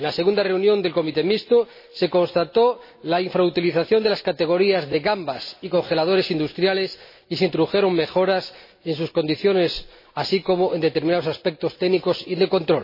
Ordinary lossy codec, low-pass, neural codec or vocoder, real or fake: MP3, 24 kbps; 5.4 kHz; none; real